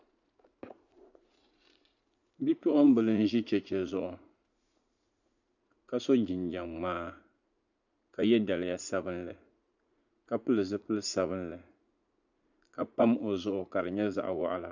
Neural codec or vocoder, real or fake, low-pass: vocoder, 44.1 kHz, 80 mel bands, Vocos; fake; 7.2 kHz